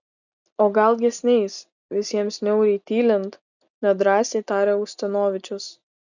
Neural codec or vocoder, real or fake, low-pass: none; real; 7.2 kHz